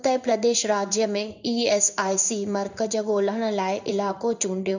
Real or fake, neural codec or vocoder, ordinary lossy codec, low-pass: fake; codec, 16 kHz in and 24 kHz out, 1 kbps, XY-Tokenizer; none; 7.2 kHz